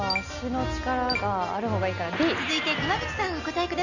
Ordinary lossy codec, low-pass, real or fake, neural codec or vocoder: none; 7.2 kHz; real; none